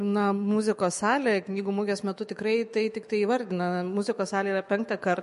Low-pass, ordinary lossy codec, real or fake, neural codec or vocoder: 14.4 kHz; MP3, 48 kbps; fake; autoencoder, 48 kHz, 128 numbers a frame, DAC-VAE, trained on Japanese speech